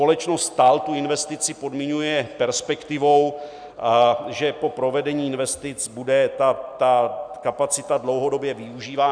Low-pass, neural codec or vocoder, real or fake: 9.9 kHz; none; real